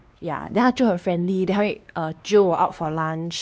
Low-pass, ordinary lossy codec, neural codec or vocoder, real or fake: none; none; codec, 16 kHz, 2 kbps, X-Codec, WavLM features, trained on Multilingual LibriSpeech; fake